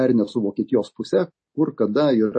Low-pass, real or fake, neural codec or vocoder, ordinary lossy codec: 9.9 kHz; real; none; MP3, 32 kbps